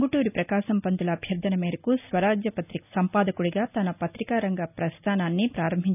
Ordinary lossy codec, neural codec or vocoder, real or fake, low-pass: none; none; real; 3.6 kHz